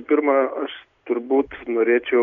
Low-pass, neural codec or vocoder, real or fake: 7.2 kHz; none; real